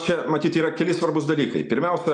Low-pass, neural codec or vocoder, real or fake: 10.8 kHz; none; real